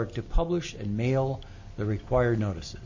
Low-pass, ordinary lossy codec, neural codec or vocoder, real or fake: 7.2 kHz; MP3, 32 kbps; none; real